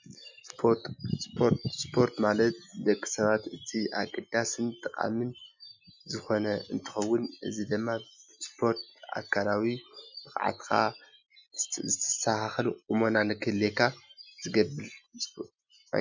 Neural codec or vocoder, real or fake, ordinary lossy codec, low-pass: none; real; MP3, 64 kbps; 7.2 kHz